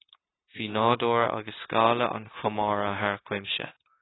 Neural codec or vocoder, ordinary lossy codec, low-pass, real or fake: codec, 16 kHz, 0.9 kbps, LongCat-Audio-Codec; AAC, 16 kbps; 7.2 kHz; fake